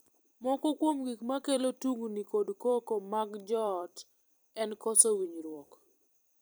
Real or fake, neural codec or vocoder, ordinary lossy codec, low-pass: fake; vocoder, 44.1 kHz, 128 mel bands every 256 samples, BigVGAN v2; none; none